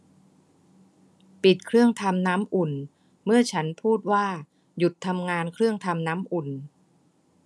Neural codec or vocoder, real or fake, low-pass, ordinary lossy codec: none; real; none; none